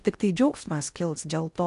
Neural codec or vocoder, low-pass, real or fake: codec, 16 kHz in and 24 kHz out, 0.6 kbps, FocalCodec, streaming, 4096 codes; 10.8 kHz; fake